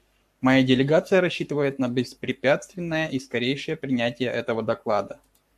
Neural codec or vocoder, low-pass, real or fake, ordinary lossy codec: codec, 44.1 kHz, 7.8 kbps, Pupu-Codec; 14.4 kHz; fake; AAC, 96 kbps